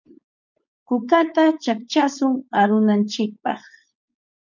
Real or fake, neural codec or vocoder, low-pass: fake; codec, 16 kHz, 4.8 kbps, FACodec; 7.2 kHz